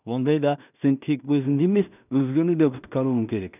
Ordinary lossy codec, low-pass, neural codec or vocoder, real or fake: none; 3.6 kHz; codec, 16 kHz in and 24 kHz out, 0.4 kbps, LongCat-Audio-Codec, two codebook decoder; fake